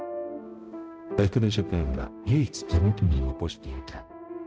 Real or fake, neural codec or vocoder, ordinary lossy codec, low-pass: fake; codec, 16 kHz, 0.5 kbps, X-Codec, HuBERT features, trained on balanced general audio; none; none